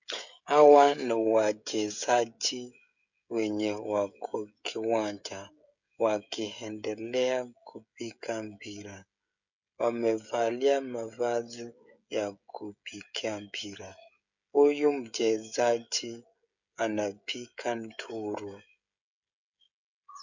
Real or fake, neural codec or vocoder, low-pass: fake; codec, 16 kHz, 16 kbps, FreqCodec, smaller model; 7.2 kHz